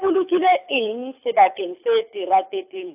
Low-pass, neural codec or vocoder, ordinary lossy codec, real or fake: 3.6 kHz; codec, 24 kHz, 6 kbps, HILCodec; AAC, 32 kbps; fake